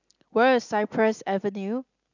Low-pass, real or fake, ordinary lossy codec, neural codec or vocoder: 7.2 kHz; real; none; none